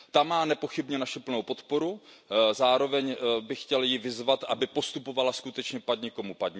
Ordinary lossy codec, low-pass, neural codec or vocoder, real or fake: none; none; none; real